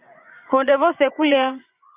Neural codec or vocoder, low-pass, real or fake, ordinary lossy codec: vocoder, 24 kHz, 100 mel bands, Vocos; 3.6 kHz; fake; Opus, 64 kbps